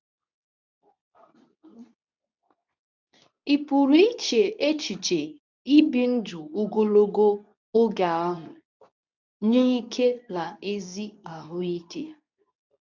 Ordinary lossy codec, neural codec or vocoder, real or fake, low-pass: none; codec, 24 kHz, 0.9 kbps, WavTokenizer, medium speech release version 2; fake; 7.2 kHz